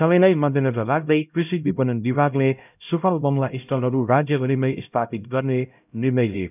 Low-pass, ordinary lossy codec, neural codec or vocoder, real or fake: 3.6 kHz; none; codec, 16 kHz, 0.5 kbps, X-Codec, HuBERT features, trained on LibriSpeech; fake